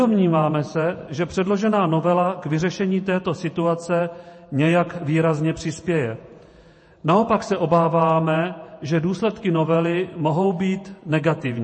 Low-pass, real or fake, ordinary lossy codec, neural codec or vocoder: 9.9 kHz; fake; MP3, 32 kbps; vocoder, 48 kHz, 128 mel bands, Vocos